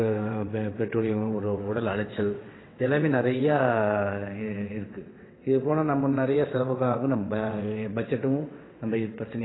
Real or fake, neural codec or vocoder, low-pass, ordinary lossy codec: fake; vocoder, 22.05 kHz, 80 mel bands, WaveNeXt; 7.2 kHz; AAC, 16 kbps